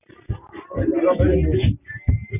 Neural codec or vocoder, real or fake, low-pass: none; real; 3.6 kHz